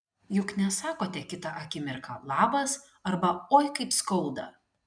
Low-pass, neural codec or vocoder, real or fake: 9.9 kHz; none; real